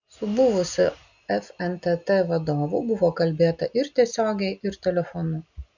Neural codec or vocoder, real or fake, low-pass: none; real; 7.2 kHz